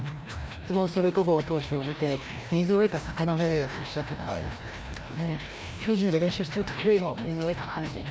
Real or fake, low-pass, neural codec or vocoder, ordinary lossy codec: fake; none; codec, 16 kHz, 1 kbps, FreqCodec, larger model; none